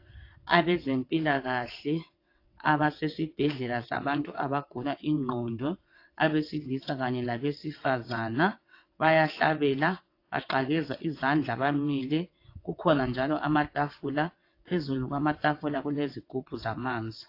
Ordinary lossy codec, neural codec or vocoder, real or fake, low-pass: AAC, 32 kbps; vocoder, 22.05 kHz, 80 mel bands, WaveNeXt; fake; 5.4 kHz